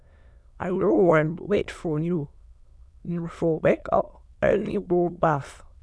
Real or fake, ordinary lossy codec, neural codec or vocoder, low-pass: fake; none; autoencoder, 22.05 kHz, a latent of 192 numbers a frame, VITS, trained on many speakers; none